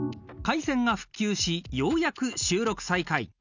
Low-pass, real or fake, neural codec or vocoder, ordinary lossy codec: 7.2 kHz; real; none; none